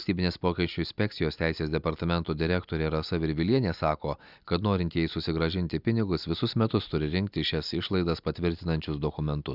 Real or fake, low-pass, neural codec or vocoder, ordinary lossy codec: real; 5.4 kHz; none; Opus, 64 kbps